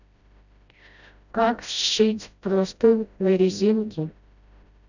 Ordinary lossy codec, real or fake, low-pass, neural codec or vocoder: none; fake; 7.2 kHz; codec, 16 kHz, 0.5 kbps, FreqCodec, smaller model